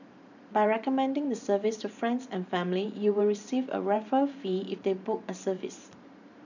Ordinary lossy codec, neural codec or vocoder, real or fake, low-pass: none; vocoder, 44.1 kHz, 128 mel bands every 512 samples, BigVGAN v2; fake; 7.2 kHz